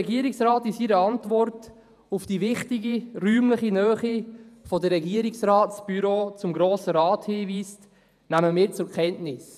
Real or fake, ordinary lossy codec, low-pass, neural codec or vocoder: fake; none; 14.4 kHz; vocoder, 48 kHz, 128 mel bands, Vocos